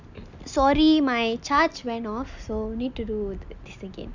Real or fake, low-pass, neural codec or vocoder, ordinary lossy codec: real; 7.2 kHz; none; none